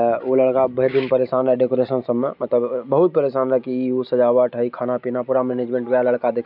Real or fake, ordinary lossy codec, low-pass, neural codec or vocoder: real; none; 5.4 kHz; none